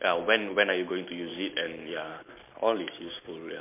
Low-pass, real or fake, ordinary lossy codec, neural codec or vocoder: 3.6 kHz; real; MP3, 24 kbps; none